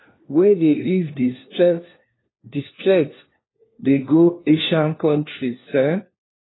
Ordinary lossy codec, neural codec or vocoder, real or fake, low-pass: AAC, 16 kbps; codec, 16 kHz, 1 kbps, FunCodec, trained on LibriTTS, 50 frames a second; fake; 7.2 kHz